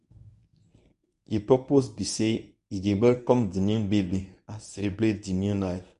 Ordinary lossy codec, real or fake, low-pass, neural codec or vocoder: none; fake; 10.8 kHz; codec, 24 kHz, 0.9 kbps, WavTokenizer, medium speech release version 1